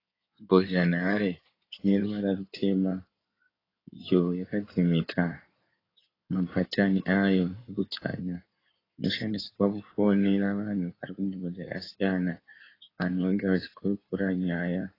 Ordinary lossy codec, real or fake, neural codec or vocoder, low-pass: AAC, 24 kbps; fake; codec, 16 kHz in and 24 kHz out, 2.2 kbps, FireRedTTS-2 codec; 5.4 kHz